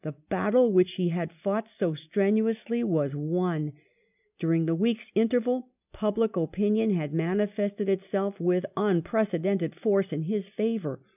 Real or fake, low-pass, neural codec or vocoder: real; 3.6 kHz; none